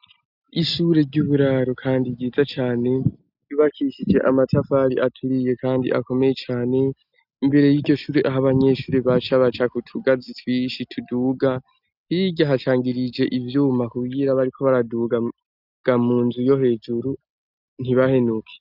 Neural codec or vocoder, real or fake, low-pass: none; real; 5.4 kHz